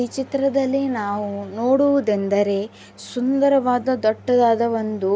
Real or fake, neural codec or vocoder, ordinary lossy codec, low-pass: real; none; none; none